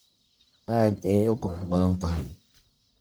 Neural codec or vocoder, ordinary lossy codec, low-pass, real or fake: codec, 44.1 kHz, 1.7 kbps, Pupu-Codec; none; none; fake